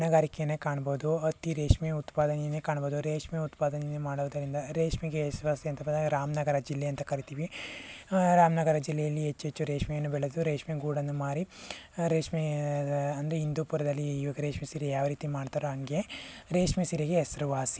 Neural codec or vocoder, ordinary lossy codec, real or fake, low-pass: none; none; real; none